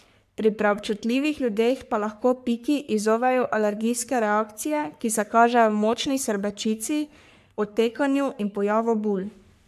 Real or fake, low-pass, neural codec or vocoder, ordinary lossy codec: fake; 14.4 kHz; codec, 44.1 kHz, 3.4 kbps, Pupu-Codec; AAC, 96 kbps